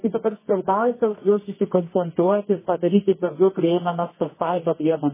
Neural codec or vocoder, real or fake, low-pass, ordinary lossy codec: codec, 24 kHz, 0.9 kbps, WavTokenizer, medium music audio release; fake; 3.6 kHz; MP3, 16 kbps